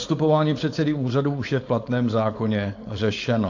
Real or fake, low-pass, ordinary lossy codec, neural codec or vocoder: fake; 7.2 kHz; AAC, 48 kbps; codec, 16 kHz, 4.8 kbps, FACodec